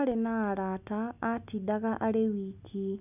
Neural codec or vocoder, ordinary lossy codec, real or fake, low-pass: none; none; real; 3.6 kHz